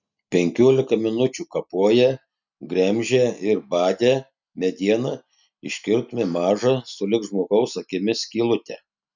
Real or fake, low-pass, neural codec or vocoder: real; 7.2 kHz; none